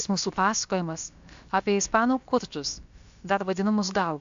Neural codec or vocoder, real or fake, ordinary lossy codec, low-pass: codec, 16 kHz, about 1 kbps, DyCAST, with the encoder's durations; fake; AAC, 64 kbps; 7.2 kHz